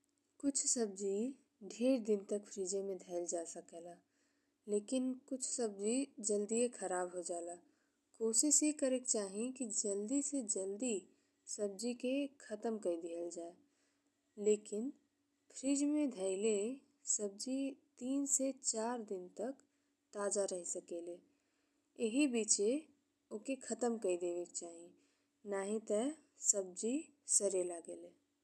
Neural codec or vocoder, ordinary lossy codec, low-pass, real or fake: none; none; none; real